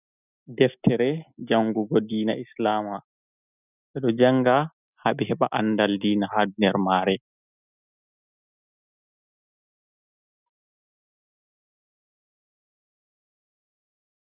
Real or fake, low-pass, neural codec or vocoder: real; 3.6 kHz; none